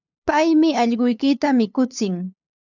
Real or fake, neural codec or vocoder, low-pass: fake; codec, 16 kHz, 8 kbps, FunCodec, trained on LibriTTS, 25 frames a second; 7.2 kHz